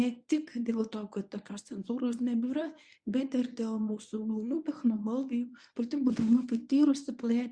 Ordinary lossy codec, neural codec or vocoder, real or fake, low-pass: Opus, 64 kbps; codec, 24 kHz, 0.9 kbps, WavTokenizer, medium speech release version 1; fake; 9.9 kHz